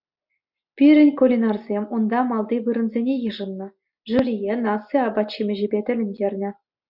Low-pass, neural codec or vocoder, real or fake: 5.4 kHz; none; real